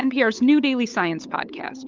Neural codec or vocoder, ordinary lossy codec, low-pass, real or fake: codec, 16 kHz, 8 kbps, FunCodec, trained on LibriTTS, 25 frames a second; Opus, 32 kbps; 7.2 kHz; fake